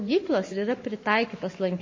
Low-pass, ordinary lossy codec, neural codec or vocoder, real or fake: 7.2 kHz; MP3, 32 kbps; codec, 44.1 kHz, 7.8 kbps, Pupu-Codec; fake